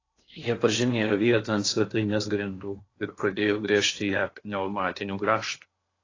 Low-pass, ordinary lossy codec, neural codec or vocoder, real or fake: 7.2 kHz; AAC, 32 kbps; codec, 16 kHz in and 24 kHz out, 0.8 kbps, FocalCodec, streaming, 65536 codes; fake